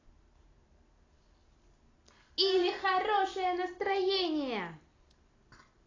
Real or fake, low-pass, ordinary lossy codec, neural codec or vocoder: real; 7.2 kHz; AAC, 32 kbps; none